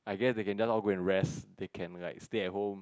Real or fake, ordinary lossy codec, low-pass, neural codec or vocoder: real; none; none; none